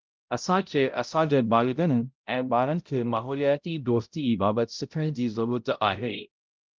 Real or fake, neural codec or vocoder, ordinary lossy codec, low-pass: fake; codec, 16 kHz, 0.5 kbps, X-Codec, HuBERT features, trained on balanced general audio; Opus, 32 kbps; 7.2 kHz